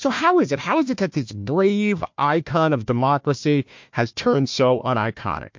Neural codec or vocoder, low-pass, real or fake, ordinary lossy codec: codec, 16 kHz, 1 kbps, FunCodec, trained on Chinese and English, 50 frames a second; 7.2 kHz; fake; MP3, 48 kbps